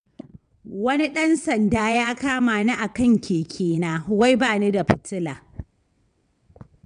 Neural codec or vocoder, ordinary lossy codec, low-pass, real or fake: vocoder, 22.05 kHz, 80 mel bands, WaveNeXt; none; 9.9 kHz; fake